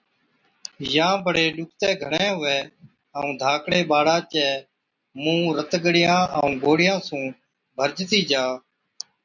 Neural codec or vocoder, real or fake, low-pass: none; real; 7.2 kHz